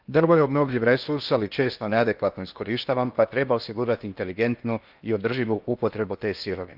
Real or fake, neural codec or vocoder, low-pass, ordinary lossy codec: fake; codec, 16 kHz in and 24 kHz out, 0.8 kbps, FocalCodec, streaming, 65536 codes; 5.4 kHz; Opus, 24 kbps